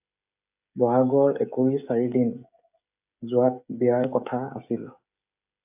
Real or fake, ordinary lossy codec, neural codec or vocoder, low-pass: fake; AAC, 32 kbps; codec, 16 kHz, 16 kbps, FreqCodec, smaller model; 3.6 kHz